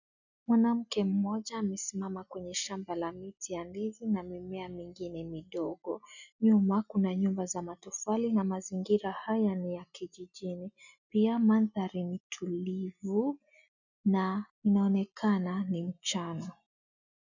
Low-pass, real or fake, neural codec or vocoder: 7.2 kHz; real; none